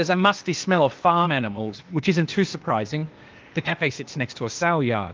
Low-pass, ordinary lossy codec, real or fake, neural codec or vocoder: 7.2 kHz; Opus, 32 kbps; fake; codec, 16 kHz, 0.8 kbps, ZipCodec